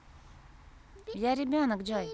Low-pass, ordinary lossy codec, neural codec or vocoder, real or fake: none; none; none; real